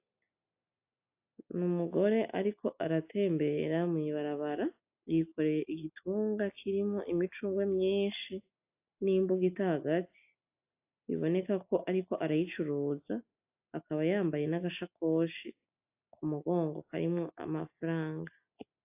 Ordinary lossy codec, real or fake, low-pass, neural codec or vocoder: AAC, 32 kbps; real; 3.6 kHz; none